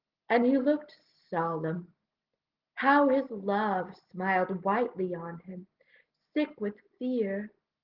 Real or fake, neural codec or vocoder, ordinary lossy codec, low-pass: real; none; Opus, 16 kbps; 5.4 kHz